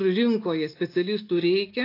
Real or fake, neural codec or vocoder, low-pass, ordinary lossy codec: real; none; 5.4 kHz; AAC, 32 kbps